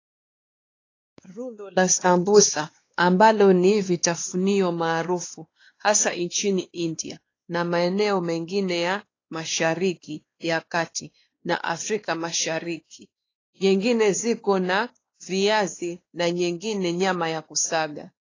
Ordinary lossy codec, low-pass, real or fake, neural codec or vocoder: AAC, 32 kbps; 7.2 kHz; fake; codec, 16 kHz, 2 kbps, X-Codec, WavLM features, trained on Multilingual LibriSpeech